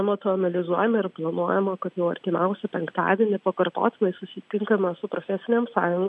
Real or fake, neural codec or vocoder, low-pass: real; none; 10.8 kHz